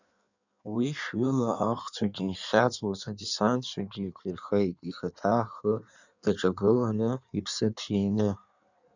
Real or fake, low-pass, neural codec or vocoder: fake; 7.2 kHz; codec, 16 kHz in and 24 kHz out, 1.1 kbps, FireRedTTS-2 codec